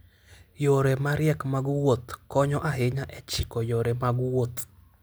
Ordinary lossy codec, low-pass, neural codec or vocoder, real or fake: none; none; none; real